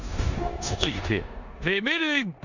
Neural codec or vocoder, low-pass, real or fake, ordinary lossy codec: codec, 16 kHz in and 24 kHz out, 0.9 kbps, LongCat-Audio-Codec, four codebook decoder; 7.2 kHz; fake; none